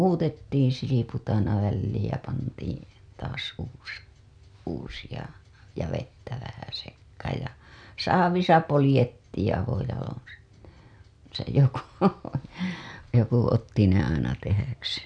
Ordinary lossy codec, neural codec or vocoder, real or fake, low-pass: none; none; real; 9.9 kHz